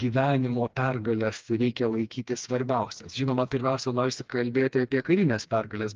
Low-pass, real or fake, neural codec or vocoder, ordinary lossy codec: 7.2 kHz; fake; codec, 16 kHz, 2 kbps, FreqCodec, smaller model; Opus, 24 kbps